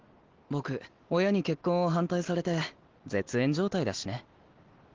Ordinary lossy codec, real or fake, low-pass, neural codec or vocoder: Opus, 16 kbps; real; 7.2 kHz; none